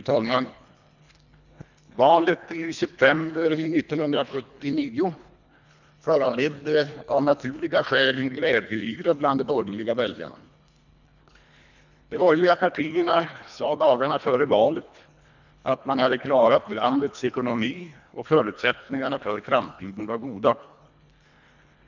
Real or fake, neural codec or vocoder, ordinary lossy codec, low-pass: fake; codec, 24 kHz, 1.5 kbps, HILCodec; none; 7.2 kHz